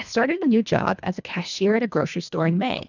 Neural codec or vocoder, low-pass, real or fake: codec, 24 kHz, 1.5 kbps, HILCodec; 7.2 kHz; fake